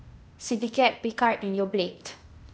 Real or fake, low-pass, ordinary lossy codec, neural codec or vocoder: fake; none; none; codec, 16 kHz, 0.8 kbps, ZipCodec